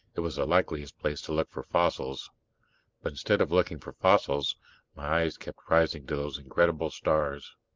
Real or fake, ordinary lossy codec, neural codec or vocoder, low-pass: real; Opus, 32 kbps; none; 7.2 kHz